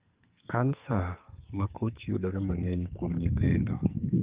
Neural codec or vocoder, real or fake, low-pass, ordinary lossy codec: codec, 44.1 kHz, 2.6 kbps, SNAC; fake; 3.6 kHz; Opus, 32 kbps